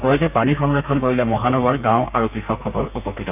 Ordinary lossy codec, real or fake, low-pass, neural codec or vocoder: none; fake; 3.6 kHz; codec, 32 kHz, 1.9 kbps, SNAC